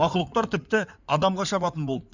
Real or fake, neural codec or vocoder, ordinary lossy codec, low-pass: fake; codec, 16 kHz in and 24 kHz out, 2.2 kbps, FireRedTTS-2 codec; none; 7.2 kHz